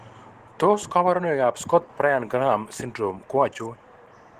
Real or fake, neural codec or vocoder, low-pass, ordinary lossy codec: real; none; 14.4 kHz; Opus, 16 kbps